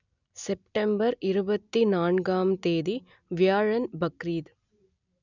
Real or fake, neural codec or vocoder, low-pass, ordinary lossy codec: real; none; 7.2 kHz; none